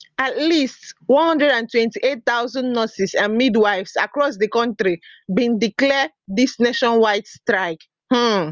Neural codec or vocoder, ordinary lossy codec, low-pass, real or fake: none; Opus, 32 kbps; 7.2 kHz; real